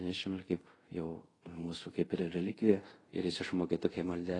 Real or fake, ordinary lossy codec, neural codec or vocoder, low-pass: fake; AAC, 32 kbps; codec, 24 kHz, 0.5 kbps, DualCodec; 10.8 kHz